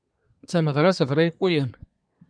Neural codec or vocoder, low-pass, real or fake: codec, 24 kHz, 1 kbps, SNAC; 9.9 kHz; fake